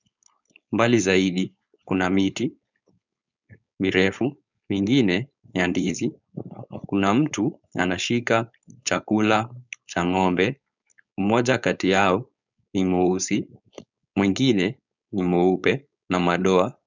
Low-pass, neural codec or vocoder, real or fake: 7.2 kHz; codec, 16 kHz, 4.8 kbps, FACodec; fake